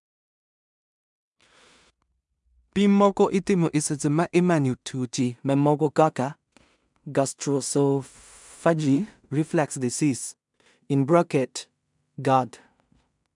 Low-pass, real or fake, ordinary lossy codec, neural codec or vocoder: 10.8 kHz; fake; MP3, 96 kbps; codec, 16 kHz in and 24 kHz out, 0.4 kbps, LongCat-Audio-Codec, two codebook decoder